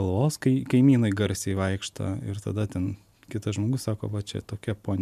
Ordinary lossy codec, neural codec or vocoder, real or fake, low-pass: MP3, 96 kbps; none; real; 14.4 kHz